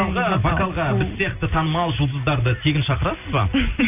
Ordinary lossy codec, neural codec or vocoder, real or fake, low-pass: none; none; real; 3.6 kHz